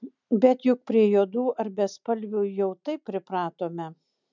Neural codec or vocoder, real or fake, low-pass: none; real; 7.2 kHz